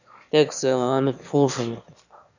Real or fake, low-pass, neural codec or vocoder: fake; 7.2 kHz; autoencoder, 22.05 kHz, a latent of 192 numbers a frame, VITS, trained on one speaker